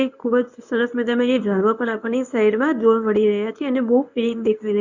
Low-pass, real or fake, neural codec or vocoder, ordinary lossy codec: 7.2 kHz; fake; codec, 24 kHz, 0.9 kbps, WavTokenizer, medium speech release version 1; none